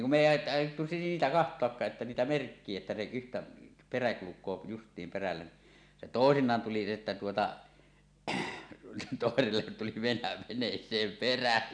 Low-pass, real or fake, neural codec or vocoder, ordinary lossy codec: 9.9 kHz; real; none; none